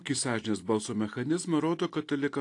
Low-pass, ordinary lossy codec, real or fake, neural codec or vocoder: 10.8 kHz; AAC, 48 kbps; real; none